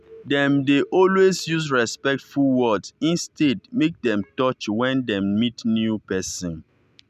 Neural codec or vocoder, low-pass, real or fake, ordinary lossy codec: none; 14.4 kHz; real; none